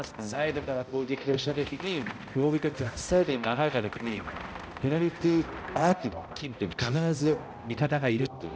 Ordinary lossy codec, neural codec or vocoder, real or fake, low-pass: none; codec, 16 kHz, 0.5 kbps, X-Codec, HuBERT features, trained on balanced general audio; fake; none